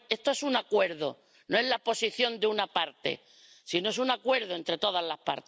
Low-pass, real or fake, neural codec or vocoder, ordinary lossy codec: none; real; none; none